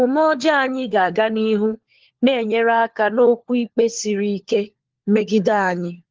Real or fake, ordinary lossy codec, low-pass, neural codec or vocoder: fake; Opus, 16 kbps; 7.2 kHz; codec, 16 kHz, 4 kbps, FunCodec, trained on LibriTTS, 50 frames a second